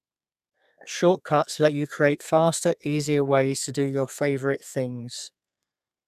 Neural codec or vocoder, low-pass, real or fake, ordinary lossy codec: codec, 32 kHz, 1.9 kbps, SNAC; 14.4 kHz; fake; none